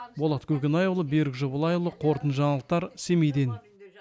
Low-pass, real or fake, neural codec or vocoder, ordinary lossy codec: none; real; none; none